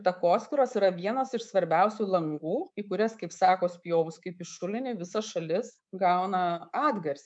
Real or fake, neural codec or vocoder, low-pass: fake; codec, 24 kHz, 3.1 kbps, DualCodec; 9.9 kHz